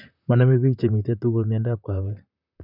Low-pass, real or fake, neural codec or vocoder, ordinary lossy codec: 5.4 kHz; fake; vocoder, 44.1 kHz, 128 mel bands, Pupu-Vocoder; none